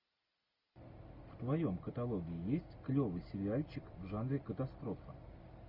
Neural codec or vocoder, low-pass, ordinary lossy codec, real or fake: none; 5.4 kHz; Opus, 64 kbps; real